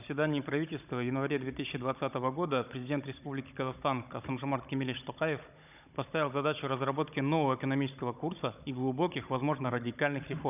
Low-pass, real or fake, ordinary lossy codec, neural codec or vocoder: 3.6 kHz; fake; none; codec, 16 kHz, 16 kbps, FunCodec, trained on Chinese and English, 50 frames a second